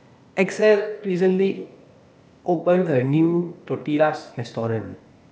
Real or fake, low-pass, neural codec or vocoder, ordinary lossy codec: fake; none; codec, 16 kHz, 0.8 kbps, ZipCodec; none